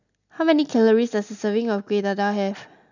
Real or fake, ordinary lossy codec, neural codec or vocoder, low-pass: real; none; none; 7.2 kHz